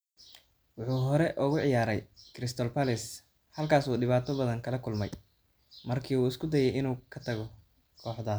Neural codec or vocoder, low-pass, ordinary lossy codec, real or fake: none; none; none; real